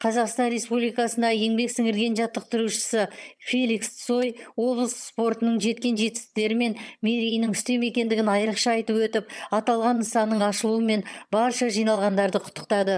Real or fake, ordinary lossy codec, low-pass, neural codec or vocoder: fake; none; none; vocoder, 22.05 kHz, 80 mel bands, HiFi-GAN